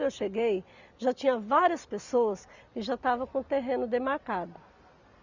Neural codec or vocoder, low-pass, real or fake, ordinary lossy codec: none; 7.2 kHz; real; Opus, 64 kbps